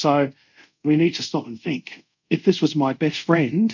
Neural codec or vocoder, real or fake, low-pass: codec, 24 kHz, 0.5 kbps, DualCodec; fake; 7.2 kHz